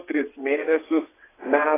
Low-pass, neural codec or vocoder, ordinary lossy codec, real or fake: 3.6 kHz; vocoder, 22.05 kHz, 80 mel bands, WaveNeXt; AAC, 16 kbps; fake